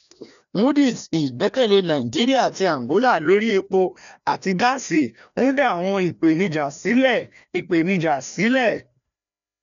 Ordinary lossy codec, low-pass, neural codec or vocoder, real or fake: MP3, 96 kbps; 7.2 kHz; codec, 16 kHz, 1 kbps, FreqCodec, larger model; fake